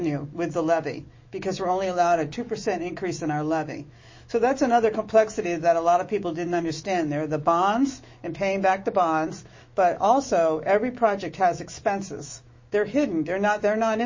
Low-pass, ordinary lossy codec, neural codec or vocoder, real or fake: 7.2 kHz; MP3, 32 kbps; autoencoder, 48 kHz, 128 numbers a frame, DAC-VAE, trained on Japanese speech; fake